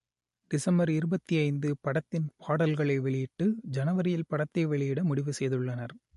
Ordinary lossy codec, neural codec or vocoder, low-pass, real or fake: MP3, 48 kbps; none; 14.4 kHz; real